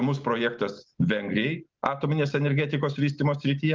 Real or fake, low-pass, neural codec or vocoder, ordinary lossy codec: real; 7.2 kHz; none; Opus, 32 kbps